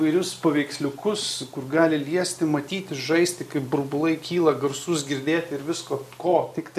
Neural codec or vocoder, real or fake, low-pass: none; real; 14.4 kHz